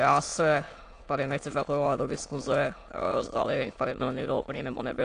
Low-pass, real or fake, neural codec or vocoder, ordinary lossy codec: 9.9 kHz; fake; autoencoder, 22.05 kHz, a latent of 192 numbers a frame, VITS, trained on many speakers; AAC, 48 kbps